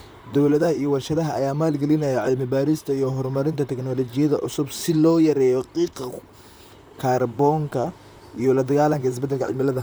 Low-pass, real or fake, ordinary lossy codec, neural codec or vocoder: none; fake; none; vocoder, 44.1 kHz, 128 mel bands, Pupu-Vocoder